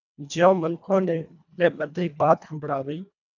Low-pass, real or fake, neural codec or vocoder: 7.2 kHz; fake; codec, 24 kHz, 1.5 kbps, HILCodec